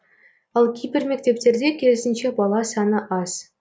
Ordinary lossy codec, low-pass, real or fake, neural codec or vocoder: none; none; real; none